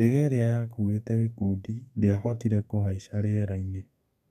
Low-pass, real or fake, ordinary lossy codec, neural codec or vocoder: 14.4 kHz; fake; none; codec, 32 kHz, 1.9 kbps, SNAC